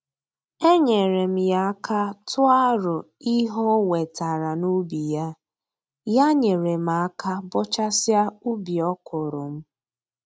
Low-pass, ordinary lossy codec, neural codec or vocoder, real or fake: none; none; none; real